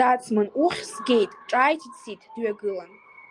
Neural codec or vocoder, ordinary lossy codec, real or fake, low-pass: none; Opus, 32 kbps; real; 10.8 kHz